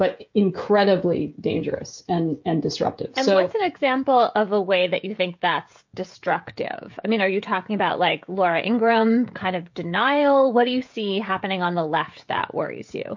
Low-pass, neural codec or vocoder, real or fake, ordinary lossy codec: 7.2 kHz; codec, 16 kHz, 16 kbps, FreqCodec, smaller model; fake; MP3, 48 kbps